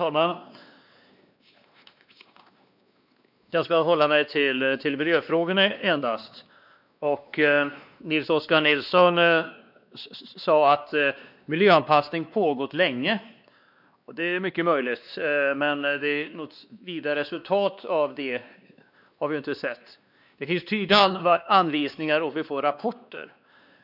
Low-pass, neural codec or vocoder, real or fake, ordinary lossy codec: 5.4 kHz; codec, 16 kHz, 2 kbps, X-Codec, WavLM features, trained on Multilingual LibriSpeech; fake; none